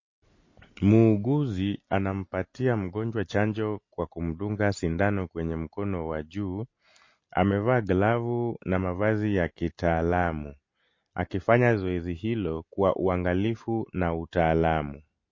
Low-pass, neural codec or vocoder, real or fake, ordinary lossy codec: 7.2 kHz; none; real; MP3, 32 kbps